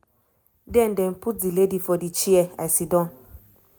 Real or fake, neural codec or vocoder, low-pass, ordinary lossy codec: real; none; none; none